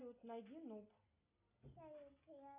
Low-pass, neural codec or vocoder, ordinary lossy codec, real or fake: 3.6 kHz; none; AAC, 32 kbps; real